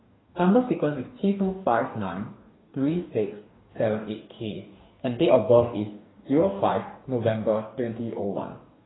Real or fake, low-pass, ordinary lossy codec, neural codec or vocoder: fake; 7.2 kHz; AAC, 16 kbps; codec, 44.1 kHz, 2.6 kbps, DAC